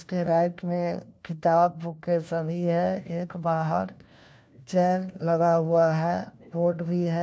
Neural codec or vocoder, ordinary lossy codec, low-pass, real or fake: codec, 16 kHz, 1 kbps, FunCodec, trained on LibriTTS, 50 frames a second; none; none; fake